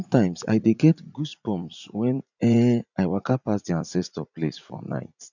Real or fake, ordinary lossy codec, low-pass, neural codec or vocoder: fake; none; 7.2 kHz; vocoder, 44.1 kHz, 80 mel bands, Vocos